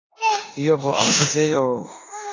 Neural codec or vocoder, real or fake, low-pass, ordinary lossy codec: codec, 16 kHz in and 24 kHz out, 0.9 kbps, LongCat-Audio-Codec, four codebook decoder; fake; 7.2 kHz; AAC, 32 kbps